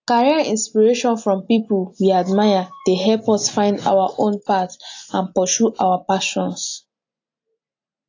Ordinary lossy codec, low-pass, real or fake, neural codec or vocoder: AAC, 48 kbps; 7.2 kHz; real; none